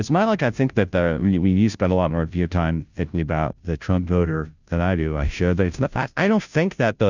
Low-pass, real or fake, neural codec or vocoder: 7.2 kHz; fake; codec, 16 kHz, 0.5 kbps, FunCodec, trained on Chinese and English, 25 frames a second